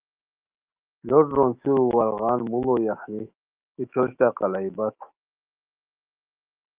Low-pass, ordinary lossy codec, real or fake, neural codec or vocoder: 3.6 kHz; Opus, 32 kbps; fake; autoencoder, 48 kHz, 128 numbers a frame, DAC-VAE, trained on Japanese speech